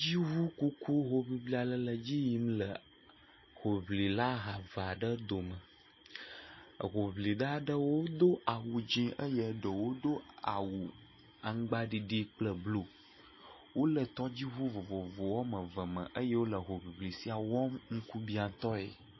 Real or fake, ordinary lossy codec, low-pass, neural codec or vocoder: real; MP3, 24 kbps; 7.2 kHz; none